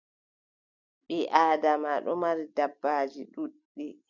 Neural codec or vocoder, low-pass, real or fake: none; 7.2 kHz; real